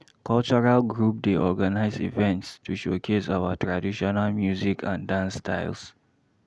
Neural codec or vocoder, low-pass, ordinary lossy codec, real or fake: none; none; none; real